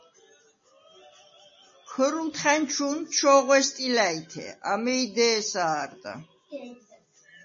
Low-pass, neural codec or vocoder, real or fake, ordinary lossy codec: 7.2 kHz; none; real; MP3, 32 kbps